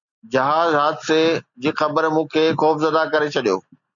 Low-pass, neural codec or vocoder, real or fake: 7.2 kHz; none; real